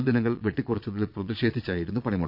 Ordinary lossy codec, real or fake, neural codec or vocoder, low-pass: none; fake; vocoder, 22.05 kHz, 80 mel bands, Vocos; 5.4 kHz